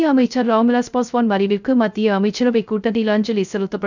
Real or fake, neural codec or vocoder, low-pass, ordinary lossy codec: fake; codec, 16 kHz, 0.3 kbps, FocalCodec; 7.2 kHz; none